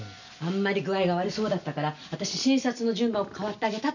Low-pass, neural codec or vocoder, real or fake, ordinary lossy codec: 7.2 kHz; none; real; none